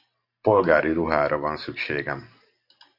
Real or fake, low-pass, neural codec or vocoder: fake; 5.4 kHz; vocoder, 44.1 kHz, 128 mel bands every 512 samples, BigVGAN v2